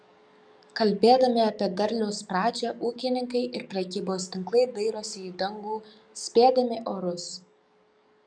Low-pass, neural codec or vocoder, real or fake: 9.9 kHz; codec, 44.1 kHz, 7.8 kbps, DAC; fake